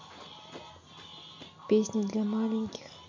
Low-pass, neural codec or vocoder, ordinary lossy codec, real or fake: 7.2 kHz; none; MP3, 48 kbps; real